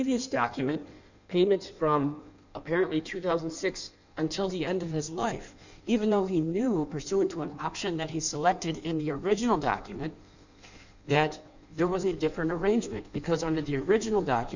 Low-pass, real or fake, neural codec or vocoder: 7.2 kHz; fake; codec, 16 kHz in and 24 kHz out, 1.1 kbps, FireRedTTS-2 codec